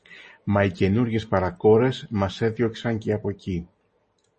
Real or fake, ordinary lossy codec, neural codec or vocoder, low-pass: real; MP3, 32 kbps; none; 10.8 kHz